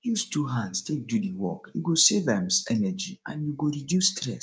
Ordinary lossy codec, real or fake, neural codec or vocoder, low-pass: none; fake; codec, 16 kHz, 6 kbps, DAC; none